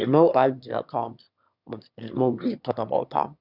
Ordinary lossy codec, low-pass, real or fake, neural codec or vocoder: none; 5.4 kHz; fake; autoencoder, 22.05 kHz, a latent of 192 numbers a frame, VITS, trained on one speaker